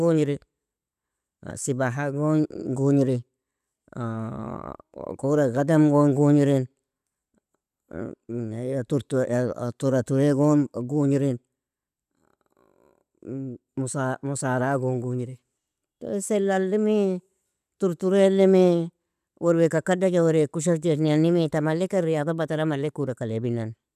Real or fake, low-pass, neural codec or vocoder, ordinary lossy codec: real; 14.4 kHz; none; none